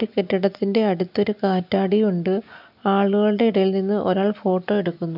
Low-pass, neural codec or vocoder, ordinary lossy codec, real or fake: 5.4 kHz; none; none; real